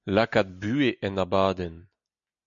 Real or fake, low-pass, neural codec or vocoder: real; 7.2 kHz; none